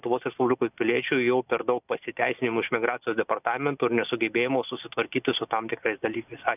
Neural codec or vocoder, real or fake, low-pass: none; real; 3.6 kHz